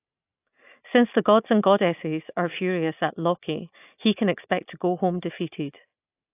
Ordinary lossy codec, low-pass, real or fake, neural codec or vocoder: AAC, 32 kbps; 3.6 kHz; real; none